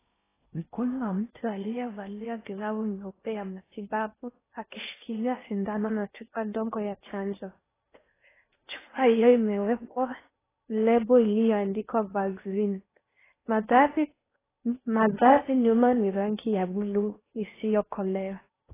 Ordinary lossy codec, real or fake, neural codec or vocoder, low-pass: AAC, 16 kbps; fake; codec, 16 kHz in and 24 kHz out, 0.6 kbps, FocalCodec, streaming, 4096 codes; 3.6 kHz